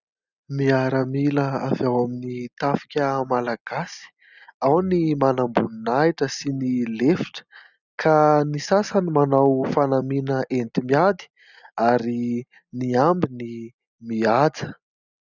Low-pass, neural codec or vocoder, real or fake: 7.2 kHz; none; real